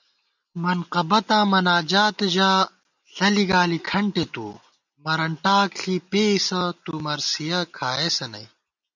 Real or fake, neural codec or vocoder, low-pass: real; none; 7.2 kHz